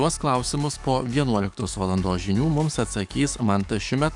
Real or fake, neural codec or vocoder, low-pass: fake; codec, 44.1 kHz, 7.8 kbps, DAC; 10.8 kHz